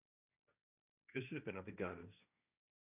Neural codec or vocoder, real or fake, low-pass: codec, 16 kHz, 1.1 kbps, Voila-Tokenizer; fake; 3.6 kHz